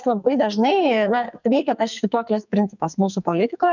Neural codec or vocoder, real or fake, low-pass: codec, 44.1 kHz, 2.6 kbps, SNAC; fake; 7.2 kHz